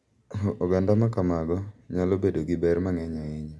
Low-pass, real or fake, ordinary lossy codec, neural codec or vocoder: none; real; none; none